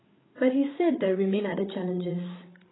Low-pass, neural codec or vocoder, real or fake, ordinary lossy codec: 7.2 kHz; codec, 16 kHz, 8 kbps, FreqCodec, larger model; fake; AAC, 16 kbps